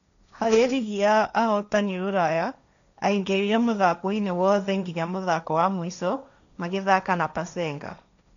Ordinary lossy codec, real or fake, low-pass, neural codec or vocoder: none; fake; 7.2 kHz; codec, 16 kHz, 1.1 kbps, Voila-Tokenizer